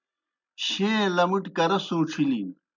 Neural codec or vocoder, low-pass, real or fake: none; 7.2 kHz; real